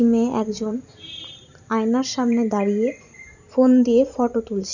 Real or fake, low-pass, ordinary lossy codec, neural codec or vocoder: fake; 7.2 kHz; none; vocoder, 44.1 kHz, 128 mel bands every 512 samples, BigVGAN v2